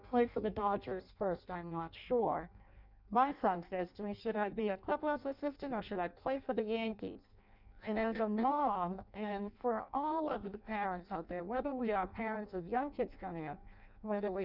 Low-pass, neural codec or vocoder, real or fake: 5.4 kHz; codec, 16 kHz in and 24 kHz out, 0.6 kbps, FireRedTTS-2 codec; fake